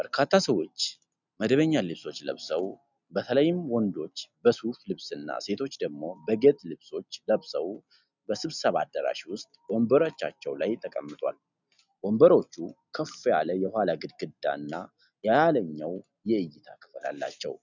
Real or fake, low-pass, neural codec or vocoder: real; 7.2 kHz; none